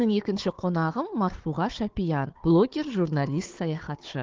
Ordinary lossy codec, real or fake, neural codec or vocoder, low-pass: Opus, 16 kbps; fake; codec, 16 kHz, 4 kbps, FunCodec, trained on Chinese and English, 50 frames a second; 7.2 kHz